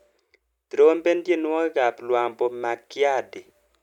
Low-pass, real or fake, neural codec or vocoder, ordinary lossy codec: 19.8 kHz; real; none; none